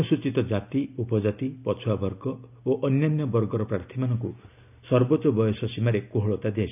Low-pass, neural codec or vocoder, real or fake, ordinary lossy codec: 3.6 kHz; none; real; none